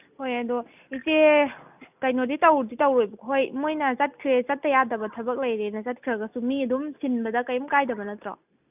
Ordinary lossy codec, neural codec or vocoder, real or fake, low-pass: none; none; real; 3.6 kHz